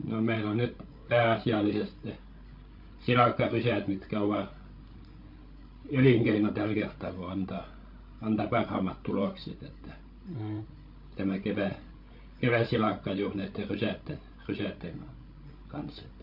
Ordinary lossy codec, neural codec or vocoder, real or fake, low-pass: AAC, 48 kbps; codec, 16 kHz, 16 kbps, FreqCodec, larger model; fake; 5.4 kHz